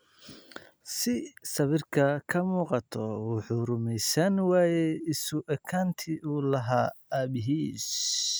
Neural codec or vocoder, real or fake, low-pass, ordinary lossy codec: none; real; none; none